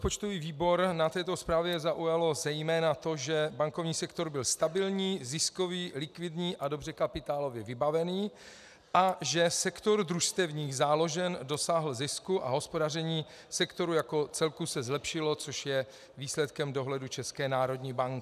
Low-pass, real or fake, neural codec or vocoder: 14.4 kHz; real; none